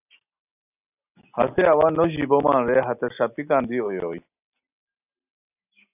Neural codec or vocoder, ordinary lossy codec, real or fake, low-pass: none; AAC, 32 kbps; real; 3.6 kHz